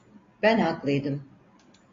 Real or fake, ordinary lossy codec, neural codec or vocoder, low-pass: real; AAC, 32 kbps; none; 7.2 kHz